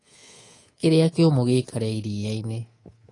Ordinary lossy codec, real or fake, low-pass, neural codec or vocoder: AAC, 32 kbps; fake; 10.8 kHz; codec, 24 kHz, 3.1 kbps, DualCodec